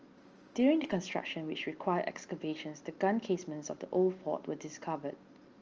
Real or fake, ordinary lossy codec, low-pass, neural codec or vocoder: real; Opus, 24 kbps; 7.2 kHz; none